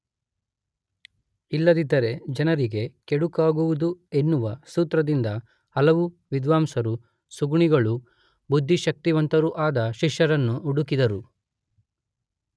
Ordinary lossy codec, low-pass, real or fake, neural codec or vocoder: none; none; real; none